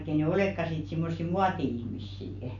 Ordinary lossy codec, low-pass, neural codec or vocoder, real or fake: none; 7.2 kHz; none; real